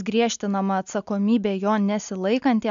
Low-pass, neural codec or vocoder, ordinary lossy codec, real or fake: 7.2 kHz; none; AAC, 96 kbps; real